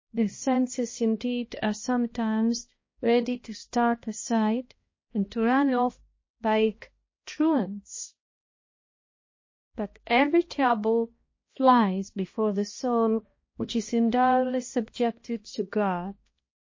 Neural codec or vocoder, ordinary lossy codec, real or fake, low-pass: codec, 16 kHz, 1 kbps, X-Codec, HuBERT features, trained on balanced general audio; MP3, 32 kbps; fake; 7.2 kHz